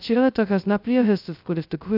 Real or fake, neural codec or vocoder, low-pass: fake; codec, 16 kHz, 0.2 kbps, FocalCodec; 5.4 kHz